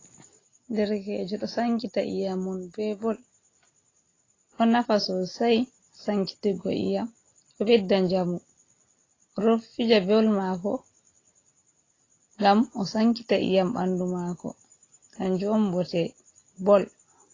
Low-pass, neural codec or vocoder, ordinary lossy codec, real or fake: 7.2 kHz; none; AAC, 32 kbps; real